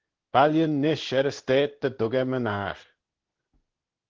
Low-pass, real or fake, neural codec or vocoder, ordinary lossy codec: 7.2 kHz; fake; codec, 16 kHz in and 24 kHz out, 1 kbps, XY-Tokenizer; Opus, 16 kbps